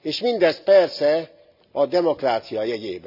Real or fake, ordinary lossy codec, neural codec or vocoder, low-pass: real; none; none; 5.4 kHz